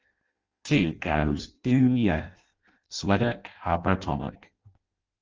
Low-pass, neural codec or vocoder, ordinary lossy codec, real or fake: 7.2 kHz; codec, 16 kHz in and 24 kHz out, 0.6 kbps, FireRedTTS-2 codec; Opus, 24 kbps; fake